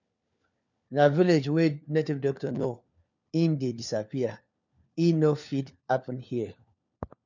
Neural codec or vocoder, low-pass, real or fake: codec, 16 kHz, 4 kbps, FunCodec, trained on LibriTTS, 50 frames a second; 7.2 kHz; fake